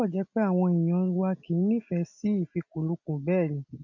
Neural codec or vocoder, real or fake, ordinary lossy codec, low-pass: none; real; none; 7.2 kHz